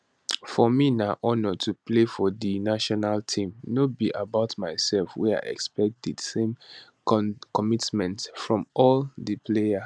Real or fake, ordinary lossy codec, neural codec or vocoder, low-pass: real; none; none; none